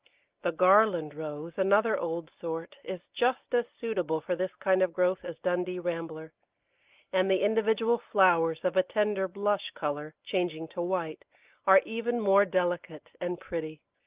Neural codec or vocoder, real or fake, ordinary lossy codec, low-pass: none; real; Opus, 24 kbps; 3.6 kHz